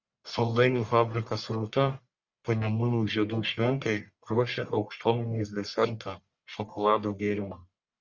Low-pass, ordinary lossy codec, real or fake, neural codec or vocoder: 7.2 kHz; Opus, 64 kbps; fake; codec, 44.1 kHz, 1.7 kbps, Pupu-Codec